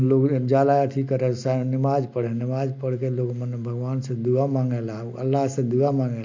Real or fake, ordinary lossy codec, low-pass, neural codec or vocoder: real; MP3, 48 kbps; 7.2 kHz; none